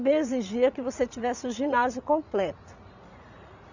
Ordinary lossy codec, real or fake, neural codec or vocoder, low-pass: none; fake; vocoder, 44.1 kHz, 80 mel bands, Vocos; 7.2 kHz